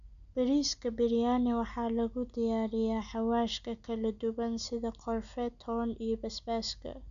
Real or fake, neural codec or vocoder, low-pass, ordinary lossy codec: real; none; 7.2 kHz; none